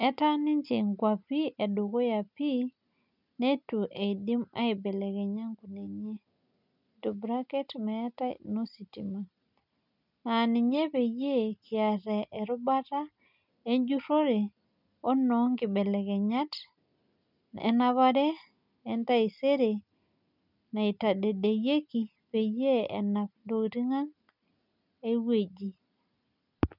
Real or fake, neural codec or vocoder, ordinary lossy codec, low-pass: real; none; none; 5.4 kHz